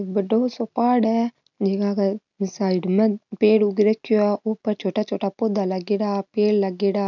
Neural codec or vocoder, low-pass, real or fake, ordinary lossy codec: none; 7.2 kHz; real; none